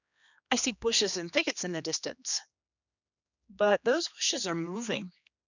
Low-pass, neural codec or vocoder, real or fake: 7.2 kHz; codec, 16 kHz, 2 kbps, X-Codec, HuBERT features, trained on general audio; fake